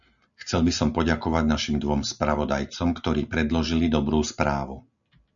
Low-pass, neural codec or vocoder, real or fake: 7.2 kHz; none; real